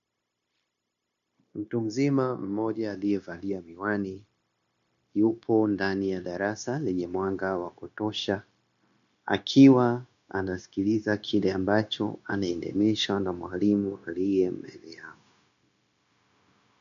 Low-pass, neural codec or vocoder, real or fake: 7.2 kHz; codec, 16 kHz, 0.9 kbps, LongCat-Audio-Codec; fake